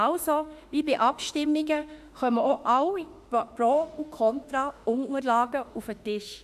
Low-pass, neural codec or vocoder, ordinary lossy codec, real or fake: 14.4 kHz; autoencoder, 48 kHz, 32 numbers a frame, DAC-VAE, trained on Japanese speech; none; fake